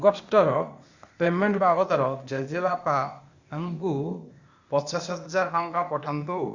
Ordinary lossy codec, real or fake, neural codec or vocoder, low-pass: Opus, 64 kbps; fake; codec, 16 kHz, 0.8 kbps, ZipCodec; 7.2 kHz